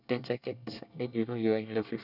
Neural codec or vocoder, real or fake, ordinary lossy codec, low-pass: codec, 24 kHz, 1 kbps, SNAC; fake; none; 5.4 kHz